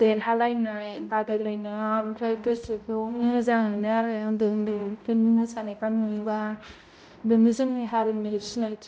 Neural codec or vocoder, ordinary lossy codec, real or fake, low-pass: codec, 16 kHz, 0.5 kbps, X-Codec, HuBERT features, trained on balanced general audio; none; fake; none